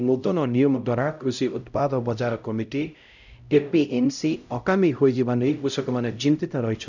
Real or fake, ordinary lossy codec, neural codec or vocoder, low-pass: fake; none; codec, 16 kHz, 0.5 kbps, X-Codec, HuBERT features, trained on LibriSpeech; 7.2 kHz